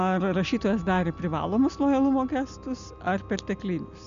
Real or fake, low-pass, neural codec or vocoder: real; 7.2 kHz; none